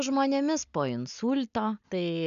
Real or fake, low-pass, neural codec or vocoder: real; 7.2 kHz; none